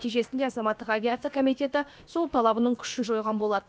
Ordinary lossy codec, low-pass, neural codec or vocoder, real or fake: none; none; codec, 16 kHz, about 1 kbps, DyCAST, with the encoder's durations; fake